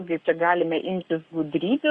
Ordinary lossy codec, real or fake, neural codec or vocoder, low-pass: Opus, 64 kbps; fake; codec, 44.1 kHz, 7.8 kbps, Pupu-Codec; 10.8 kHz